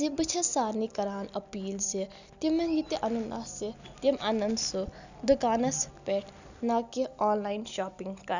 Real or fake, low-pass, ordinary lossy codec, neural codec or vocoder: real; 7.2 kHz; none; none